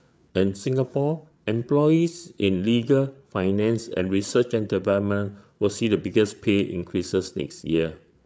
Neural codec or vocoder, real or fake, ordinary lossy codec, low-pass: codec, 16 kHz, 8 kbps, FreqCodec, larger model; fake; none; none